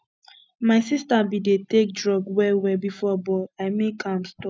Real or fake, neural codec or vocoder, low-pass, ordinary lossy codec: real; none; none; none